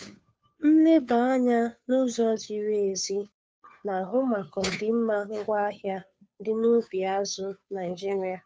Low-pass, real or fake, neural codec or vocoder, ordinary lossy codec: none; fake; codec, 16 kHz, 2 kbps, FunCodec, trained on Chinese and English, 25 frames a second; none